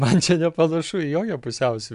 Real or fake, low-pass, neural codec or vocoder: real; 10.8 kHz; none